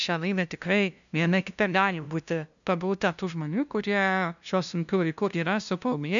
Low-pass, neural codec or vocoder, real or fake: 7.2 kHz; codec, 16 kHz, 0.5 kbps, FunCodec, trained on LibriTTS, 25 frames a second; fake